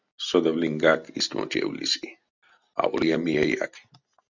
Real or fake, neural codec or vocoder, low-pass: real; none; 7.2 kHz